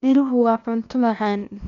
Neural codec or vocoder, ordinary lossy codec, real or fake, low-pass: codec, 16 kHz, 0.8 kbps, ZipCodec; none; fake; 7.2 kHz